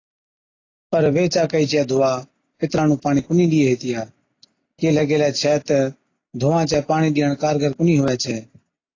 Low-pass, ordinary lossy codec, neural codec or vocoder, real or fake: 7.2 kHz; AAC, 32 kbps; none; real